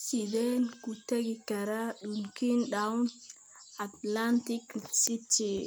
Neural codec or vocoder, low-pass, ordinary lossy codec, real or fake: none; none; none; real